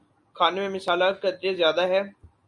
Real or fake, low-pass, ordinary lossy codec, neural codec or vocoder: real; 10.8 kHz; MP3, 48 kbps; none